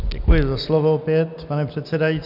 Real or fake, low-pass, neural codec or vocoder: real; 5.4 kHz; none